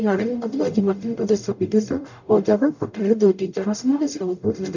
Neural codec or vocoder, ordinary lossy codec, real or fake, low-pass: codec, 44.1 kHz, 0.9 kbps, DAC; none; fake; 7.2 kHz